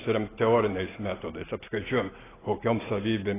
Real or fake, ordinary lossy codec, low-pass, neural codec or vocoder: real; AAC, 16 kbps; 3.6 kHz; none